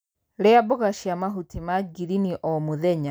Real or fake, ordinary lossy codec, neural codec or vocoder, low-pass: real; none; none; none